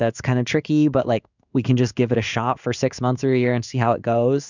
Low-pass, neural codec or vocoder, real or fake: 7.2 kHz; codec, 16 kHz in and 24 kHz out, 1 kbps, XY-Tokenizer; fake